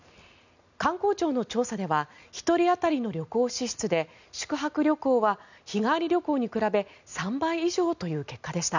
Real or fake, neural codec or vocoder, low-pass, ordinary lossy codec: real; none; 7.2 kHz; none